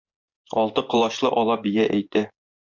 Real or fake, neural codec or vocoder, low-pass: fake; vocoder, 24 kHz, 100 mel bands, Vocos; 7.2 kHz